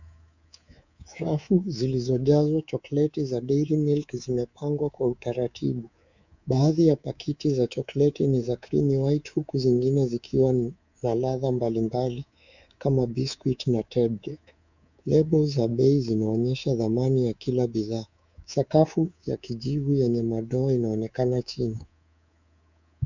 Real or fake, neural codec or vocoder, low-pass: fake; codec, 24 kHz, 3.1 kbps, DualCodec; 7.2 kHz